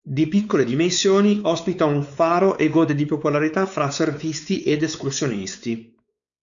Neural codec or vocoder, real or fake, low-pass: codec, 16 kHz, 4 kbps, X-Codec, WavLM features, trained on Multilingual LibriSpeech; fake; 7.2 kHz